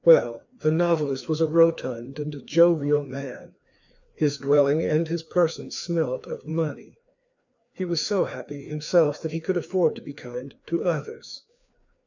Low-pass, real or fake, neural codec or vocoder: 7.2 kHz; fake; codec, 16 kHz, 2 kbps, FreqCodec, larger model